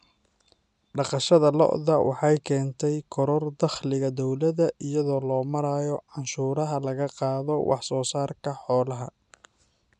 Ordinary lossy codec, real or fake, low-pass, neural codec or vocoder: none; real; 10.8 kHz; none